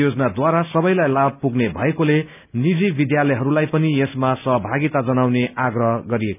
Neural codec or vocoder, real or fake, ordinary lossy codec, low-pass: none; real; none; 3.6 kHz